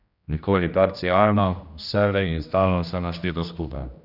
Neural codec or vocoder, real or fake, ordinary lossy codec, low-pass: codec, 16 kHz, 1 kbps, X-Codec, HuBERT features, trained on general audio; fake; none; 5.4 kHz